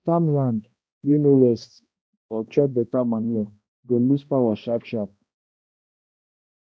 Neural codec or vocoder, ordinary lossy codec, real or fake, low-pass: codec, 16 kHz, 1 kbps, X-Codec, HuBERT features, trained on balanced general audio; none; fake; none